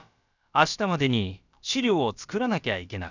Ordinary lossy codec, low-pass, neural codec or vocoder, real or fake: none; 7.2 kHz; codec, 16 kHz, about 1 kbps, DyCAST, with the encoder's durations; fake